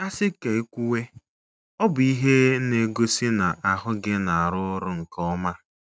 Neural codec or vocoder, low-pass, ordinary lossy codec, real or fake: none; none; none; real